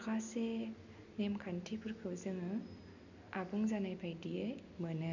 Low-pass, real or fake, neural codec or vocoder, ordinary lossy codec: 7.2 kHz; real; none; none